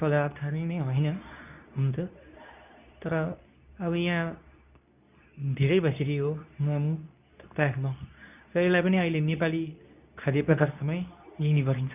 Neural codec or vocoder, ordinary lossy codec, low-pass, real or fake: codec, 24 kHz, 0.9 kbps, WavTokenizer, medium speech release version 2; none; 3.6 kHz; fake